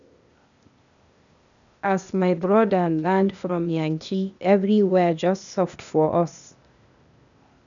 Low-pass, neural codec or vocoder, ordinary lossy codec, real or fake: 7.2 kHz; codec, 16 kHz, 0.8 kbps, ZipCodec; none; fake